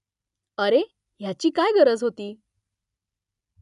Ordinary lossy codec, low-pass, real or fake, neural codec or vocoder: none; 10.8 kHz; real; none